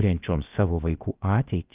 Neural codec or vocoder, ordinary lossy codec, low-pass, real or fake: codec, 16 kHz, about 1 kbps, DyCAST, with the encoder's durations; Opus, 32 kbps; 3.6 kHz; fake